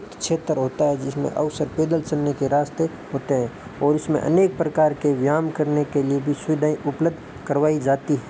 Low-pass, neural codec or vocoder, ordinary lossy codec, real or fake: none; none; none; real